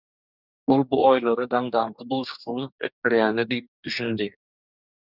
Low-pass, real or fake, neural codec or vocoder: 5.4 kHz; fake; codec, 44.1 kHz, 2.6 kbps, DAC